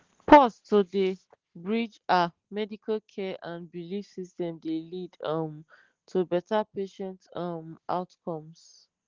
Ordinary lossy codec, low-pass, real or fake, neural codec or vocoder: Opus, 16 kbps; 7.2 kHz; real; none